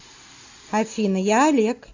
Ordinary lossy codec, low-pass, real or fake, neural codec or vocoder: AAC, 48 kbps; 7.2 kHz; real; none